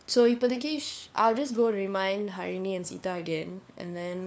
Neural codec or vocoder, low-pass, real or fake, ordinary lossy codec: codec, 16 kHz, 4 kbps, FunCodec, trained on LibriTTS, 50 frames a second; none; fake; none